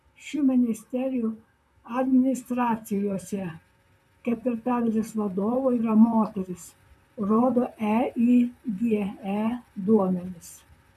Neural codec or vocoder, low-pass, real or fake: vocoder, 44.1 kHz, 128 mel bands, Pupu-Vocoder; 14.4 kHz; fake